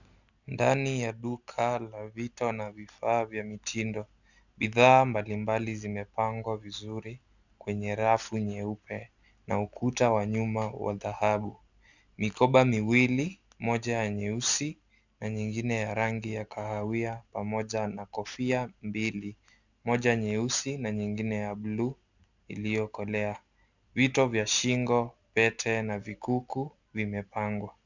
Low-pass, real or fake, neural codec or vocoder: 7.2 kHz; real; none